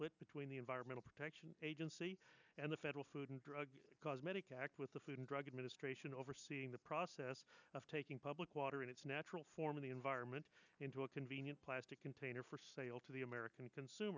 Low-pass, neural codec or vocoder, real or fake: 7.2 kHz; none; real